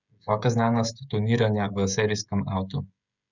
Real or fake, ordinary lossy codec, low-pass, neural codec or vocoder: fake; none; 7.2 kHz; codec, 16 kHz, 16 kbps, FreqCodec, smaller model